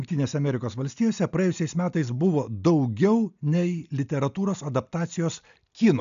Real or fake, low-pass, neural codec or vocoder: real; 7.2 kHz; none